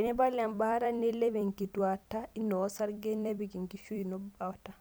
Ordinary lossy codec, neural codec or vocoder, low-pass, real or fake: none; vocoder, 44.1 kHz, 128 mel bands every 256 samples, BigVGAN v2; none; fake